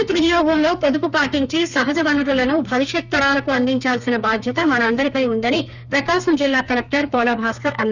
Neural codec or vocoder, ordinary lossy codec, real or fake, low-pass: codec, 32 kHz, 1.9 kbps, SNAC; none; fake; 7.2 kHz